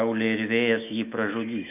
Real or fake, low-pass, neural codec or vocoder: fake; 3.6 kHz; autoencoder, 48 kHz, 128 numbers a frame, DAC-VAE, trained on Japanese speech